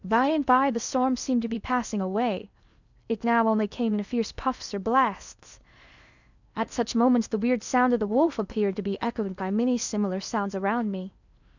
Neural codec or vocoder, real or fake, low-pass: codec, 16 kHz in and 24 kHz out, 0.8 kbps, FocalCodec, streaming, 65536 codes; fake; 7.2 kHz